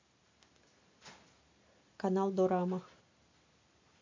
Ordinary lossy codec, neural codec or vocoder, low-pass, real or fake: MP3, 48 kbps; none; 7.2 kHz; real